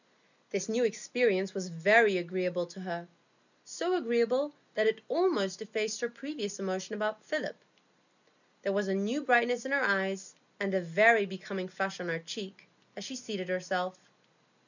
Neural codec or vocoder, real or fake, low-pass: none; real; 7.2 kHz